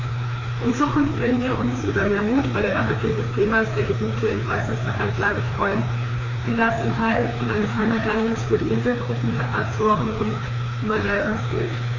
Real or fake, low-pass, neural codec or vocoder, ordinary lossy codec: fake; 7.2 kHz; codec, 16 kHz, 2 kbps, FreqCodec, larger model; AAC, 32 kbps